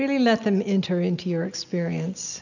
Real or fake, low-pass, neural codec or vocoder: real; 7.2 kHz; none